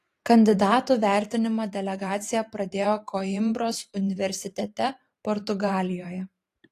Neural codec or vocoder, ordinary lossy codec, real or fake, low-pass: vocoder, 44.1 kHz, 128 mel bands every 512 samples, BigVGAN v2; AAC, 48 kbps; fake; 14.4 kHz